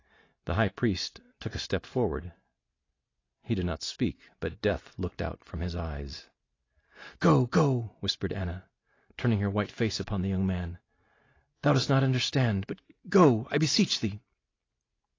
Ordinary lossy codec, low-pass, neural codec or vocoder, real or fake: AAC, 32 kbps; 7.2 kHz; none; real